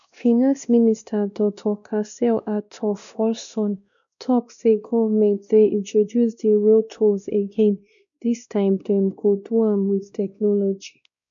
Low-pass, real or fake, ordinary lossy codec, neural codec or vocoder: 7.2 kHz; fake; none; codec, 16 kHz, 1 kbps, X-Codec, WavLM features, trained on Multilingual LibriSpeech